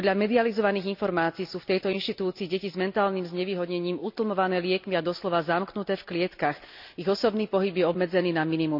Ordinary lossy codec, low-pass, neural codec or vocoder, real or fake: none; 5.4 kHz; none; real